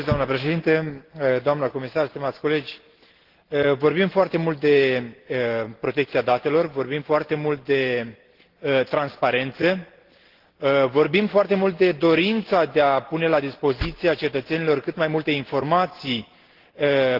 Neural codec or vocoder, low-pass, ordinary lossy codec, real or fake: none; 5.4 kHz; Opus, 16 kbps; real